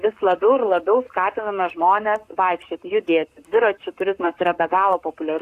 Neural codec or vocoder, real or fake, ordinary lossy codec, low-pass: codec, 44.1 kHz, 7.8 kbps, Pupu-Codec; fake; AAC, 64 kbps; 14.4 kHz